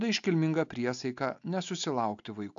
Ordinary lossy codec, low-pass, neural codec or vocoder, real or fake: AAC, 64 kbps; 7.2 kHz; none; real